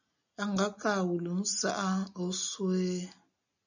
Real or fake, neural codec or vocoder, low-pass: real; none; 7.2 kHz